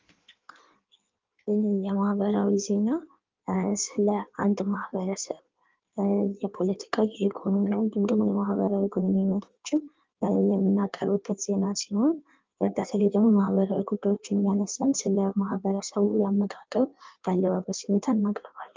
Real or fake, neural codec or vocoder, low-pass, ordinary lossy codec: fake; codec, 16 kHz in and 24 kHz out, 1.1 kbps, FireRedTTS-2 codec; 7.2 kHz; Opus, 32 kbps